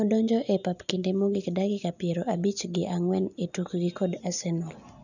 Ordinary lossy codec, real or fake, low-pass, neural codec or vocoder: none; real; 7.2 kHz; none